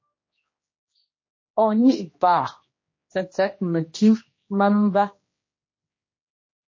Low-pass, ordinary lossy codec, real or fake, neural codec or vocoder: 7.2 kHz; MP3, 32 kbps; fake; codec, 16 kHz, 1 kbps, X-Codec, HuBERT features, trained on general audio